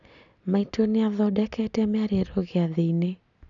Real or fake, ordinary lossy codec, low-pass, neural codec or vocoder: real; none; 7.2 kHz; none